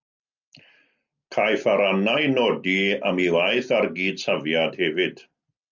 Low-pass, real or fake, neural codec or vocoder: 7.2 kHz; real; none